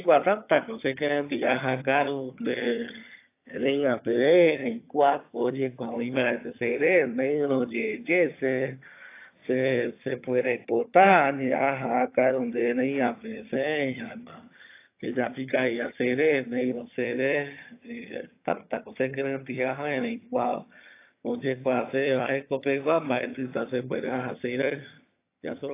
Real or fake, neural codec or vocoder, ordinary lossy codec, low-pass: fake; vocoder, 22.05 kHz, 80 mel bands, HiFi-GAN; AAC, 24 kbps; 3.6 kHz